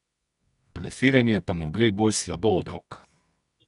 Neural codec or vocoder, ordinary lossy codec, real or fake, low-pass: codec, 24 kHz, 0.9 kbps, WavTokenizer, medium music audio release; none; fake; 10.8 kHz